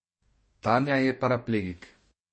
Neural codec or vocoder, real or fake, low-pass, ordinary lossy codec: codec, 44.1 kHz, 2.6 kbps, DAC; fake; 9.9 kHz; MP3, 32 kbps